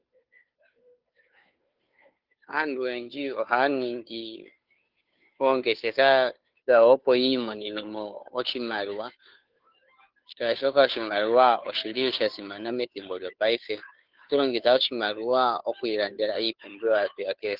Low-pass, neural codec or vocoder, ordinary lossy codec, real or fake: 5.4 kHz; codec, 16 kHz, 2 kbps, FunCodec, trained on Chinese and English, 25 frames a second; Opus, 32 kbps; fake